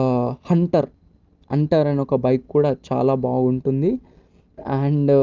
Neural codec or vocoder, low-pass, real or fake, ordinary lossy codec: none; 7.2 kHz; real; Opus, 32 kbps